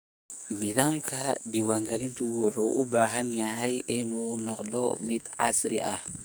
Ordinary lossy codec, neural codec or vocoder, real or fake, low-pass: none; codec, 44.1 kHz, 2.6 kbps, SNAC; fake; none